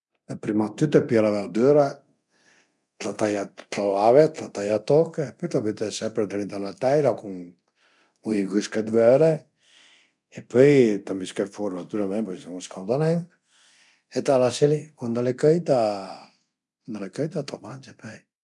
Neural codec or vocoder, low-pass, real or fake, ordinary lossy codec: codec, 24 kHz, 0.9 kbps, DualCodec; 10.8 kHz; fake; none